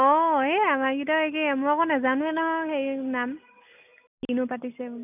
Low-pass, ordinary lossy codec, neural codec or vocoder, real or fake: 3.6 kHz; none; none; real